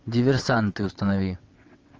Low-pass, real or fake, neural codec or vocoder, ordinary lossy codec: 7.2 kHz; fake; vocoder, 22.05 kHz, 80 mel bands, WaveNeXt; Opus, 24 kbps